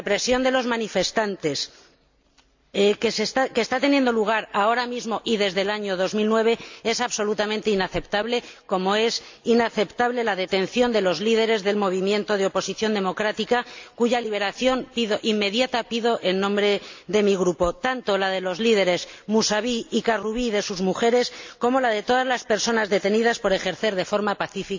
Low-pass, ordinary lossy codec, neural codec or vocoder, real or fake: 7.2 kHz; MP3, 64 kbps; none; real